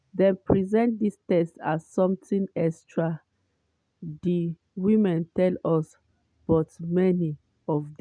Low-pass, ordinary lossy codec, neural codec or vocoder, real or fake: 9.9 kHz; none; none; real